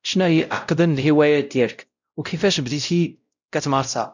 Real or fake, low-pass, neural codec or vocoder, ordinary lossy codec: fake; 7.2 kHz; codec, 16 kHz, 0.5 kbps, X-Codec, WavLM features, trained on Multilingual LibriSpeech; none